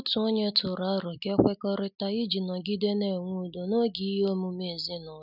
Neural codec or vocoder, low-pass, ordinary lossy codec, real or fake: none; 5.4 kHz; none; real